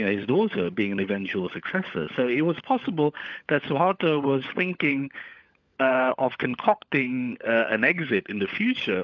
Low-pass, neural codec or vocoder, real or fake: 7.2 kHz; codec, 16 kHz, 16 kbps, FunCodec, trained on LibriTTS, 50 frames a second; fake